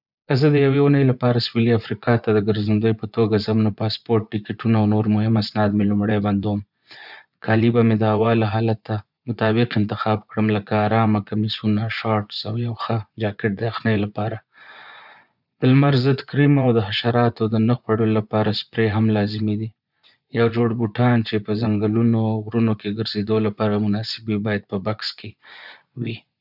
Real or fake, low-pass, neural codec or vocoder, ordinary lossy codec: fake; 5.4 kHz; vocoder, 24 kHz, 100 mel bands, Vocos; none